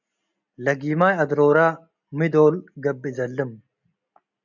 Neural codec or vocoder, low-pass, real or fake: none; 7.2 kHz; real